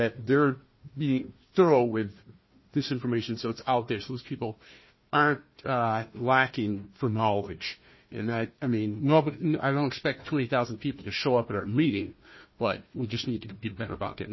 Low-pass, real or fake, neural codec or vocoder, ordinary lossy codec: 7.2 kHz; fake; codec, 16 kHz, 1 kbps, FunCodec, trained on Chinese and English, 50 frames a second; MP3, 24 kbps